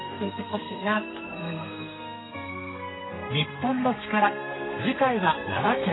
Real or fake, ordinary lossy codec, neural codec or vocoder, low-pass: fake; AAC, 16 kbps; codec, 32 kHz, 1.9 kbps, SNAC; 7.2 kHz